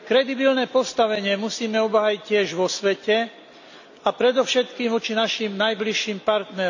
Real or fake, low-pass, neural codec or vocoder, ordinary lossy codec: real; 7.2 kHz; none; none